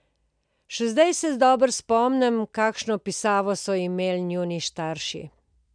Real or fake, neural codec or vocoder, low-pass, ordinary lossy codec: real; none; 9.9 kHz; none